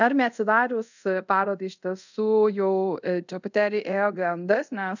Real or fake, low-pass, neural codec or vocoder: fake; 7.2 kHz; codec, 24 kHz, 0.5 kbps, DualCodec